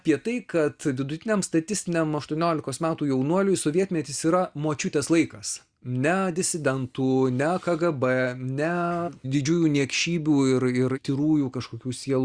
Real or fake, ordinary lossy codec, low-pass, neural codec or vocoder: real; Opus, 64 kbps; 9.9 kHz; none